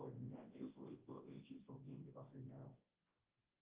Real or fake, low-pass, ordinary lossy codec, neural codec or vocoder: fake; 3.6 kHz; Opus, 32 kbps; codec, 24 kHz, 0.9 kbps, WavTokenizer, large speech release